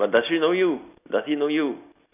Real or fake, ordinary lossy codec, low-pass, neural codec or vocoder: real; none; 3.6 kHz; none